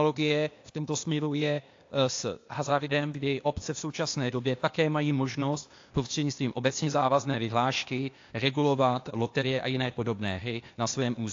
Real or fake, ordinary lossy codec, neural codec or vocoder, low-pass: fake; AAC, 48 kbps; codec, 16 kHz, 0.8 kbps, ZipCodec; 7.2 kHz